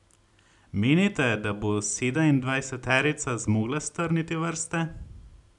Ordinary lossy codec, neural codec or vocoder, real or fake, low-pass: none; none; real; 10.8 kHz